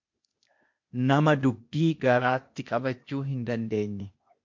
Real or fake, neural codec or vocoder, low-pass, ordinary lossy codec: fake; codec, 16 kHz, 0.8 kbps, ZipCodec; 7.2 kHz; MP3, 48 kbps